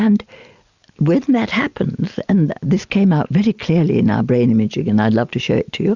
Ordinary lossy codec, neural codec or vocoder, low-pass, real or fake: Opus, 64 kbps; none; 7.2 kHz; real